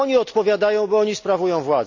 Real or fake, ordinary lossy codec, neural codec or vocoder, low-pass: real; none; none; 7.2 kHz